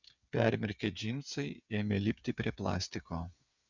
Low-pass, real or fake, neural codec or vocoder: 7.2 kHz; fake; codec, 16 kHz, 8 kbps, FreqCodec, smaller model